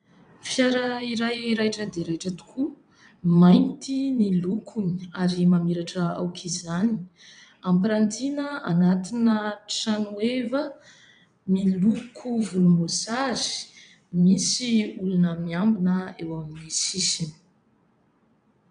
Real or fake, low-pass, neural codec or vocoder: fake; 9.9 kHz; vocoder, 22.05 kHz, 80 mel bands, WaveNeXt